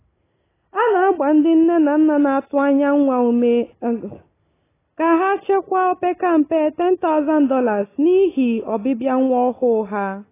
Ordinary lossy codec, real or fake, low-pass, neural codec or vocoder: AAC, 16 kbps; real; 3.6 kHz; none